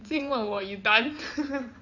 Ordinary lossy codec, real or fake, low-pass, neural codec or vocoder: none; fake; 7.2 kHz; codec, 16 kHz in and 24 kHz out, 2.2 kbps, FireRedTTS-2 codec